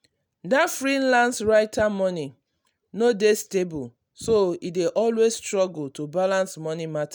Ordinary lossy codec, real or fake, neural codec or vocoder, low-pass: none; real; none; none